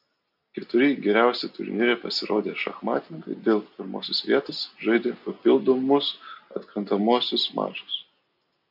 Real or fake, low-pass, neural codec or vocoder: real; 5.4 kHz; none